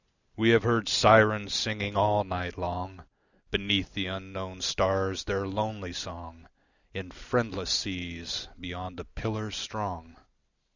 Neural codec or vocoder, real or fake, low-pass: none; real; 7.2 kHz